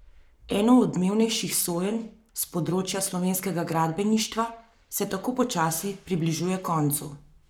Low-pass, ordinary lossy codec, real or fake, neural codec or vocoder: none; none; fake; codec, 44.1 kHz, 7.8 kbps, Pupu-Codec